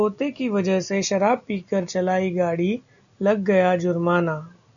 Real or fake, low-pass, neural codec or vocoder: real; 7.2 kHz; none